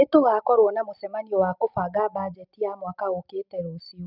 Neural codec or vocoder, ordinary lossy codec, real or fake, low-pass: none; none; real; 5.4 kHz